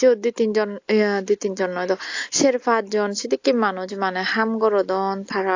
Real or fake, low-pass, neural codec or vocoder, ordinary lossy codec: real; 7.2 kHz; none; AAC, 48 kbps